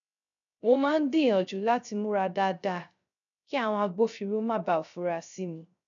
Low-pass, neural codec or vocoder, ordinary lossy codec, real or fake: 7.2 kHz; codec, 16 kHz, 0.3 kbps, FocalCodec; none; fake